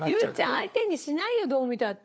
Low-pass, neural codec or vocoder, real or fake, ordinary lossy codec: none; codec, 16 kHz, 4 kbps, FunCodec, trained on LibriTTS, 50 frames a second; fake; none